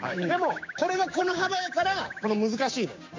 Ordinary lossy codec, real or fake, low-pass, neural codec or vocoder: MP3, 48 kbps; fake; 7.2 kHz; codec, 16 kHz, 6 kbps, DAC